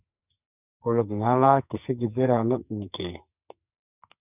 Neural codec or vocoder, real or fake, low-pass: codec, 44.1 kHz, 2.6 kbps, SNAC; fake; 3.6 kHz